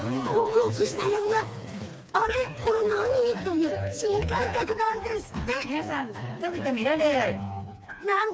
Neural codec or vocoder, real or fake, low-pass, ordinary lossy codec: codec, 16 kHz, 2 kbps, FreqCodec, smaller model; fake; none; none